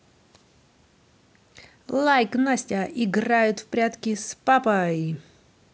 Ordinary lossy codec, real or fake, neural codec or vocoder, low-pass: none; real; none; none